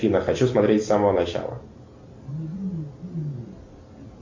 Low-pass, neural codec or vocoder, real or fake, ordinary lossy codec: 7.2 kHz; none; real; MP3, 64 kbps